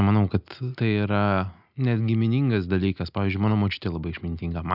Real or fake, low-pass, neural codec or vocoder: real; 5.4 kHz; none